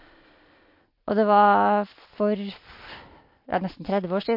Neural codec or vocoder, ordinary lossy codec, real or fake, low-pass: none; MP3, 32 kbps; real; 5.4 kHz